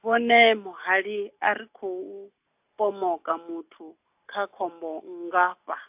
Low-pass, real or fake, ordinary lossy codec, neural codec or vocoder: 3.6 kHz; real; AAC, 32 kbps; none